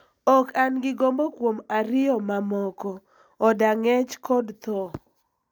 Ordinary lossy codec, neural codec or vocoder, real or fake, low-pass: none; none; real; 19.8 kHz